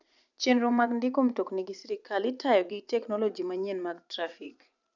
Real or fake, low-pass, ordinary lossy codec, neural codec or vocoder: fake; 7.2 kHz; none; vocoder, 44.1 kHz, 80 mel bands, Vocos